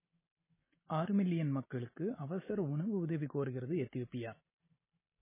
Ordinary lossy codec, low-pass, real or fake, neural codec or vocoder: MP3, 16 kbps; 3.6 kHz; real; none